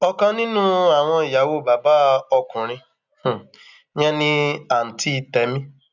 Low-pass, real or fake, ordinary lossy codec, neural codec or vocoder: 7.2 kHz; real; none; none